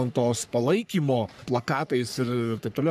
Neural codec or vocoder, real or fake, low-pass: codec, 44.1 kHz, 3.4 kbps, Pupu-Codec; fake; 14.4 kHz